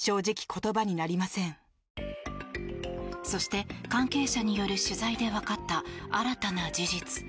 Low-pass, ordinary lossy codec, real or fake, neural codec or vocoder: none; none; real; none